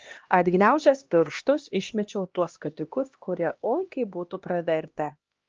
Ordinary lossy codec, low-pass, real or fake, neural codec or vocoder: Opus, 24 kbps; 7.2 kHz; fake; codec, 16 kHz, 1 kbps, X-Codec, HuBERT features, trained on LibriSpeech